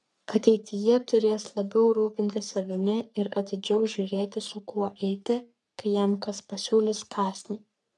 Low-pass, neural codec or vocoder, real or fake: 10.8 kHz; codec, 44.1 kHz, 3.4 kbps, Pupu-Codec; fake